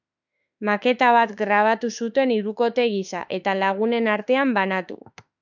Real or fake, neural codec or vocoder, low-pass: fake; autoencoder, 48 kHz, 32 numbers a frame, DAC-VAE, trained on Japanese speech; 7.2 kHz